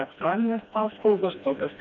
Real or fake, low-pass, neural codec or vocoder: fake; 7.2 kHz; codec, 16 kHz, 1 kbps, FreqCodec, smaller model